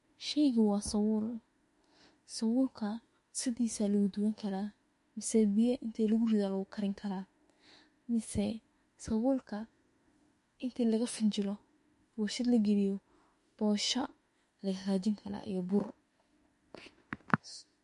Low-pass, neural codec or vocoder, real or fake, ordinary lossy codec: 14.4 kHz; autoencoder, 48 kHz, 32 numbers a frame, DAC-VAE, trained on Japanese speech; fake; MP3, 48 kbps